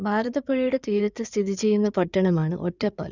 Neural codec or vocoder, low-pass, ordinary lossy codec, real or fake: codec, 16 kHz in and 24 kHz out, 2.2 kbps, FireRedTTS-2 codec; 7.2 kHz; none; fake